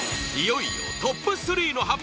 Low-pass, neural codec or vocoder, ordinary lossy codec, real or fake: none; none; none; real